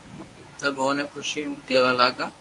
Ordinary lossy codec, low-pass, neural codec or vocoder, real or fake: AAC, 48 kbps; 10.8 kHz; codec, 24 kHz, 0.9 kbps, WavTokenizer, medium speech release version 1; fake